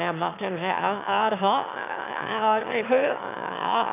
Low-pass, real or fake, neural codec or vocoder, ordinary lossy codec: 3.6 kHz; fake; autoencoder, 22.05 kHz, a latent of 192 numbers a frame, VITS, trained on one speaker; none